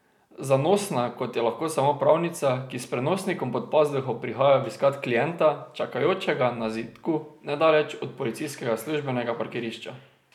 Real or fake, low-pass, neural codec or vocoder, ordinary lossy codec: real; 19.8 kHz; none; none